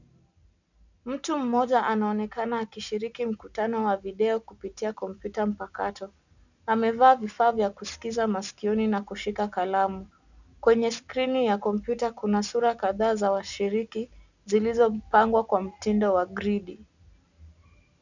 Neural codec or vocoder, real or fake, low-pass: none; real; 7.2 kHz